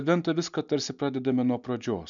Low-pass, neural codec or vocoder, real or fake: 7.2 kHz; none; real